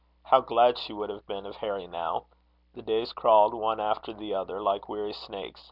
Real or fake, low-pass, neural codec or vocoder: real; 5.4 kHz; none